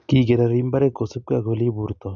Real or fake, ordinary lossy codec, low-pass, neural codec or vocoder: real; none; 7.2 kHz; none